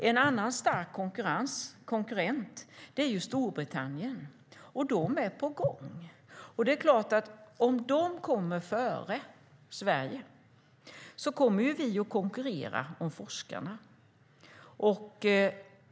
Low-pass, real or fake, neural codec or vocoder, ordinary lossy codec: none; real; none; none